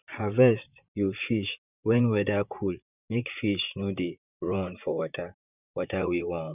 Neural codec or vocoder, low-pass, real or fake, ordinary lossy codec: vocoder, 44.1 kHz, 128 mel bands, Pupu-Vocoder; 3.6 kHz; fake; none